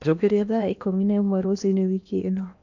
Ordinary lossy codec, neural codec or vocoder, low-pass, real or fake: none; codec, 16 kHz in and 24 kHz out, 0.8 kbps, FocalCodec, streaming, 65536 codes; 7.2 kHz; fake